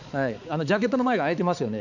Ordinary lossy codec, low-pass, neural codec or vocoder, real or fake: none; 7.2 kHz; codec, 16 kHz, 4 kbps, X-Codec, HuBERT features, trained on balanced general audio; fake